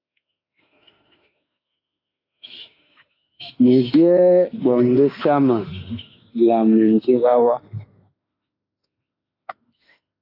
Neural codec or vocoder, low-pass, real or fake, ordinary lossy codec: autoencoder, 48 kHz, 32 numbers a frame, DAC-VAE, trained on Japanese speech; 5.4 kHz; fake; AAC, 32 kbps